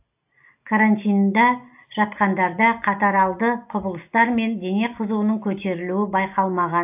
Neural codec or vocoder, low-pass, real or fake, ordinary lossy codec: none; 3.6 kHz; real; AAC, 32 kbps